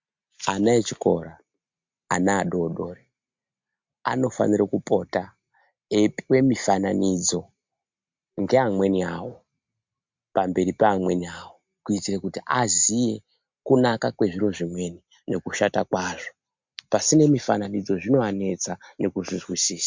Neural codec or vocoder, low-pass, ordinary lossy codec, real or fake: none; 7.2 kHz; MP3, 64 kbps; real